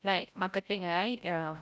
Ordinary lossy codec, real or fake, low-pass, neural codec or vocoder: none; fake; none; codec, 16 kHz, 0.5 kbps, FreqCodec, larger model